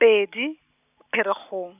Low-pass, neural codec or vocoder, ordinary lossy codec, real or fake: 3.6 kHz; none; none; real